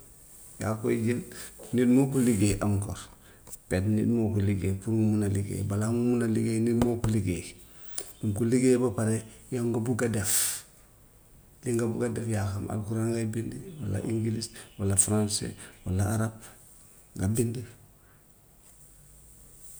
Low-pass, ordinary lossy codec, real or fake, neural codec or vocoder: none; none; real; none